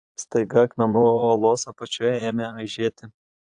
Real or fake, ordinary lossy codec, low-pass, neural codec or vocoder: fake; AAC, 64 kbps; 9.9 kHz; vocoder, 22.05 kHz, 80 mel bands, Vocos